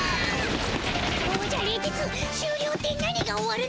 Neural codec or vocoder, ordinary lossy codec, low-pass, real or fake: none; none; none; real